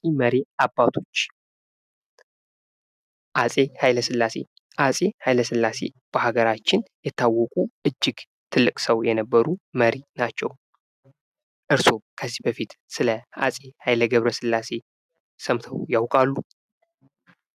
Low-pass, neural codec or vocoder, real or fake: 14.4 kHz; none; real